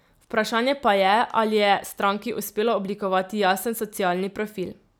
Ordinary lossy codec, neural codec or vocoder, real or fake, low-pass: none; none; real; none